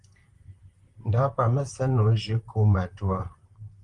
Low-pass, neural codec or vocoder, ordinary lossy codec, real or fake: 10.8 kHz; vocoder, 44.1 kHz, 128 mel bands, Pupu-Vocoder; Opus, 24 kbps; fake